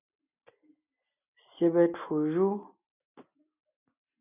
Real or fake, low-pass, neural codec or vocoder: real; 3.6 kHz; none